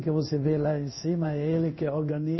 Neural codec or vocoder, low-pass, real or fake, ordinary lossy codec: codec, 16 kHz in and 24 kHz out, 1 kbps, XY-Tokenizer; 7.2 kHz; fake; MP3, 24 kbps